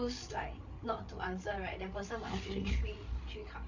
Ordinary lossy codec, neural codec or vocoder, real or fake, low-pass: none; codec, 16 kHz, 8 kbps, FreqCodec, larger model; fake; 7.2 kHz